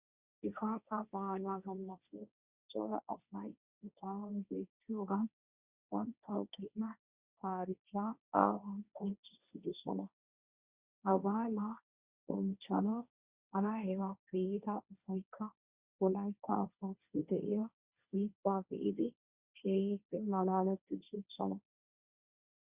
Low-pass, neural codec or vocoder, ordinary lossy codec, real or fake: 3.6 kHz; codec, 16 kHz, 1.1 kbps, Voila-Tokenizer; Opus, 64 kbps; fake